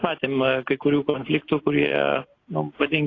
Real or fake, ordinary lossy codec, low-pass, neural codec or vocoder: real; AAC, 32 kbps; 7.2 kHz; none